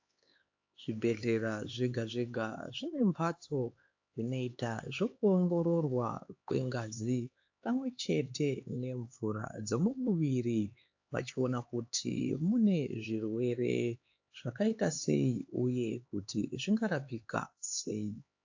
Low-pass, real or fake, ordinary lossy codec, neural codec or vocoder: 7.2 kHz; fake; AAC, 48 kbps; codec, 16 kHz, 4 kbps, X-Codec, HuBERT features, trained on LibriSpeech